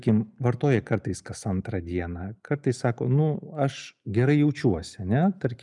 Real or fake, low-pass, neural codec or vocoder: real; 10.8 kHz; none